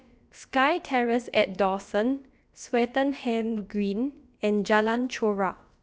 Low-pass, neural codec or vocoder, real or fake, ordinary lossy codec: none; codec, 16 kHz, about 1 kbps, DyCAST, with the encoder's durations; fake; none